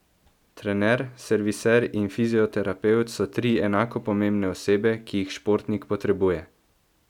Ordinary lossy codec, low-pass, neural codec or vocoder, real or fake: none; 19.8 kHz; none; real